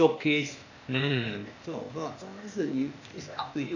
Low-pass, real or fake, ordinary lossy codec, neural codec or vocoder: 7.2 kHz; fake; none; codec, 16 kHz, 0.8 kbps, ZipCodec